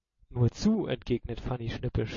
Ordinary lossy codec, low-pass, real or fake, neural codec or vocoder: MP3, 32 kbps; 7.2 kHz; real; none